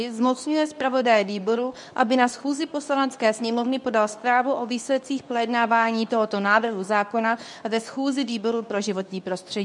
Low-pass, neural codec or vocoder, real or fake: 10.8 kHz; codec, 24 kHz, 0.9 kbps, WavTokenizer, medium speech release version 2; fake